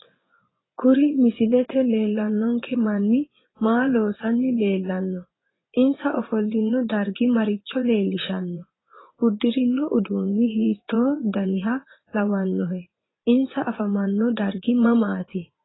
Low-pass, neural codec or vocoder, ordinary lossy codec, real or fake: 7.2 kHz; vocoder, 24 kHz, 100 mel bands, Vocos; AAC, 16 kbps; fake